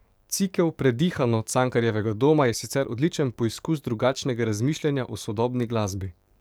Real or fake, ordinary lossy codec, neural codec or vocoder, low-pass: fake; none; codec, 44.1 kHz, 7.8 kbps, DAC; none